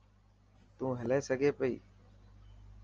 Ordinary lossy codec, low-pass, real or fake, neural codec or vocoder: Opus, 24 kbps; 7.2 kHz; real; none